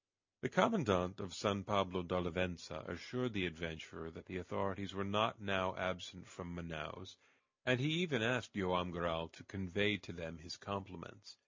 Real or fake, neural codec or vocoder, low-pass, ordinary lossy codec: real; none; 7.2 kHz; MP3, 64 kbps